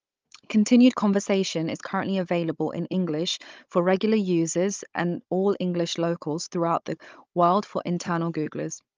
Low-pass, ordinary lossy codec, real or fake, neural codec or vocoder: 7.2 kHz; Opus, 24 kbps; fake; codec, 16 kHz, 16 kbps, FunCodec, trained on Chinese and English, 50 frames a second